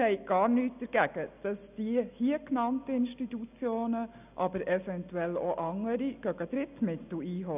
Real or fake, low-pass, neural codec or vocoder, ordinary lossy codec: real; 3.6 kHz; none; none